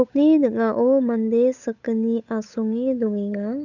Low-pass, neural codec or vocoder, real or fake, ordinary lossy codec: 7.2 kHz; codec, 16 kHz, 8 kbps, FunCodec, trained on Chinese and English, 25 frames a second; fake; none